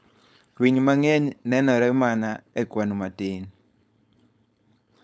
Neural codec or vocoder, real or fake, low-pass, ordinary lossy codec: codec, 16 kHz, 4.8 kbps, FACodec; fake; none; none